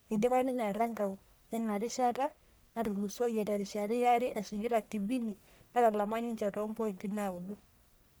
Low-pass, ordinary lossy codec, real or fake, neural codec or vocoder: none; none; fake; codec, 44.1 kHz, 1.7 kbps, Pupu-Codec